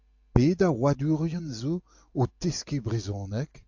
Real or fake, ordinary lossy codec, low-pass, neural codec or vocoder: real; AAC, 48 kbps; 7.2 kHz; none